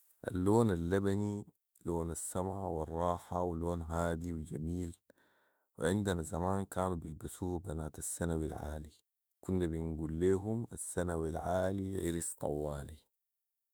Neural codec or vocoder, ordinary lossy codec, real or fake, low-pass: autoencoder, 48 kHz, 32 numbers a frame, DAC-VAE, trained on Japanese speech; none; fake; none